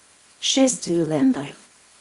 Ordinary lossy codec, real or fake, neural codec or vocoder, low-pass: Opus, 64 kbps; fake; codec, 24 kHz, 0.9 kbps, WavTokenizer, small release; 10.8 kHz